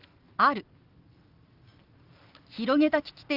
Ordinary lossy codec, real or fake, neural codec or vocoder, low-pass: Opus, 24 kbps; real; none; 5.4 kHz